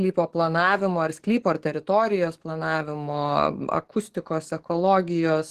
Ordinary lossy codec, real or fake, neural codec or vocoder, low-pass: Opus, 16 kbps; fake; codec, 44.1 kHz, 7.8 kbps, DAC; 14.4 kHz